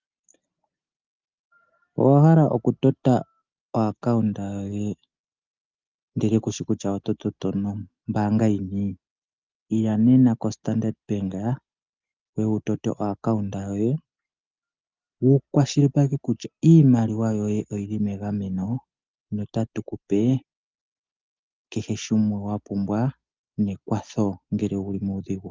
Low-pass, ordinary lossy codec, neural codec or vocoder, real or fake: 7.2 kHz; Opus, 24 kbps; none; real